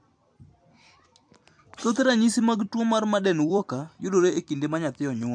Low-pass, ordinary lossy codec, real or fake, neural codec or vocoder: 9.9 kHz; MP3, 64 kbps; fake; vocoder, 44.1 kHz, 128 mel bands every 256 samples, BigVGAN v2